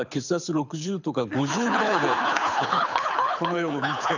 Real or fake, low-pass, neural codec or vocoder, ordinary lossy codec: fake; 7.2 kHz; codec, 24 kHz, 6 kbps, HILCodec; none